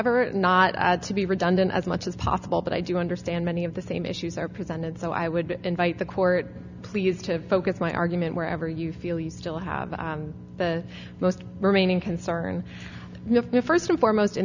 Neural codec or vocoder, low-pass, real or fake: none; 7.2 kHz; real